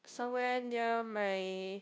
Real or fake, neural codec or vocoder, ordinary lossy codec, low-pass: fake; codec, 16 kHz, 0.5 kbps, FunCodec, trained on Chinese and English, 25 frames a second; none; none